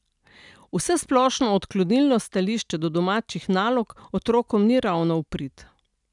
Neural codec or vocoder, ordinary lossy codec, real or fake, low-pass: none; none; real; 10.8 kHz